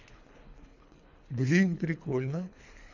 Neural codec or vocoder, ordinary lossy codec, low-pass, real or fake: codec, 24 kHz, 3 kbps, HILCodec; none; 7.2 kHz; fake